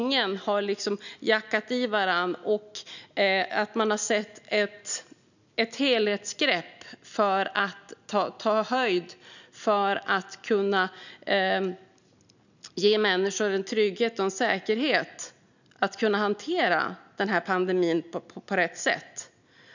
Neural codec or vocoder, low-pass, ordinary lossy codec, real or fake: none; 7.2 kHz; none; real